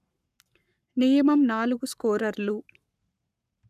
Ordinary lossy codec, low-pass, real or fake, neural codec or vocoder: none; 14.4 kHz; fake; codec, 44.1 kHz, 7.8 kbps, Pupu-Codec